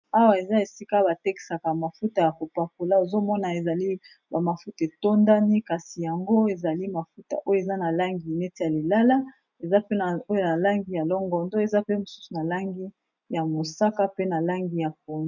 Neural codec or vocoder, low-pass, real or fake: none; 7.2 kHz; real